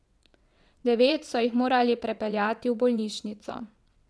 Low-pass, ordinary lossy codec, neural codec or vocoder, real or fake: none; none; vocoder, 22.05 kHz, 80 mel bands, WaveNeXt; fake